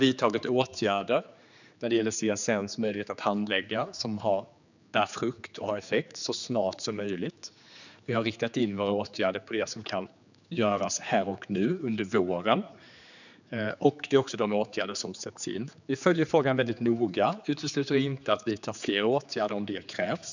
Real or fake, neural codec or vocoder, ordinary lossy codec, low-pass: fake; codec, 16 kHz, 4 kbps, X-Codec, HuBERT features, trained on general audio; none; 7.2 kHz